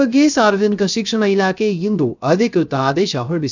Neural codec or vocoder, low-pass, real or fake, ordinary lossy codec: codec, 16 kHz, 0.3 kbps, FocalCodec; 7.2 kHz; fake; none